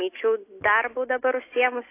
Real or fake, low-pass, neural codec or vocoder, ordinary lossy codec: real; 3.6 kHz; none; AAC, 24 kbps